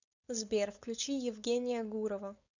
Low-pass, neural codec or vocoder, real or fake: 7.2 kHz; codec, 16 kHz, 4.8 kbps, FACodec; fake